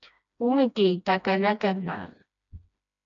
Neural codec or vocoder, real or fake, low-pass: codec, 16 kHz, 1 kbps, FreqCodec, smaller model; fake; 7.2 kHz